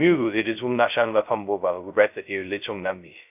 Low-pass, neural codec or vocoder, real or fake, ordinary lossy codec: 3.6 kHz; codec, 16 kHz, 0.2 kbps, FocalCodec; fake; none